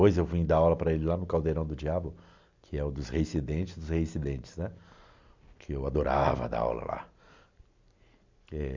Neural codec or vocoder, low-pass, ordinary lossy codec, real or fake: none; 7.2 kHz; MP3, 64 kbps; real